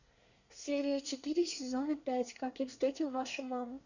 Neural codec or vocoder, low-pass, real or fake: codec, 24 kHz, 1 kbps, SNAC; 7.2 kHz; fake